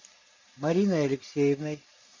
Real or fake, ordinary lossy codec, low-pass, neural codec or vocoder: real; MP3, 48 kbps; 7.2 kHz; none